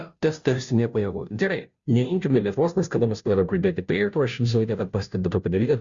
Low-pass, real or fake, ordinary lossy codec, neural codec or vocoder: 7.2 kHz; fake; Opus, 64 kbps; codec, 16 kHz, 0.5 kbps, FunCodec, trained on Chinese and English, 25 frames a second